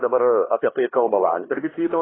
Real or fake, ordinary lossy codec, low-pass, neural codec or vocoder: fake; AAC, 16 kbps; 7.2 kHz; codec, 16 kHz, 2 kbps, X-Codec, HuBERT features, trained on LibriSpeech